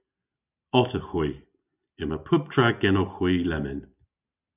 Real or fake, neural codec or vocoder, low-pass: real; none; 3.6 kHz